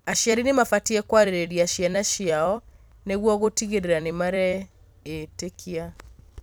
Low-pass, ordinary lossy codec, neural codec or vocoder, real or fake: none; none; vocoder, 44.1 kHz, 128 mel bands every 256 samples, BigVGAN v2; fake